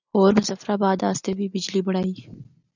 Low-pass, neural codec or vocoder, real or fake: 7.2 kHz; none; real